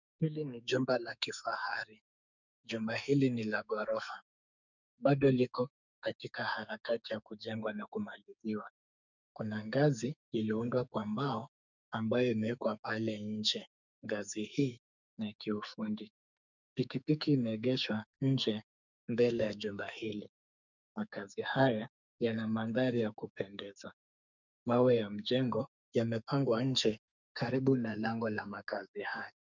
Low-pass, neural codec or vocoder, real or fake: 7.2 kHz; codec, 44.1 kHz, 2.6 kbps, SNAC; fake